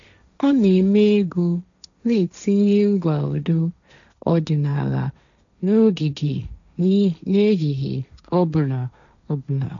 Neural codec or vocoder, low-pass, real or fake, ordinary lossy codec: codec, 16 kHz, 1.1 kbps, Voila-Tokenizer; 7.2 kHz; fake; none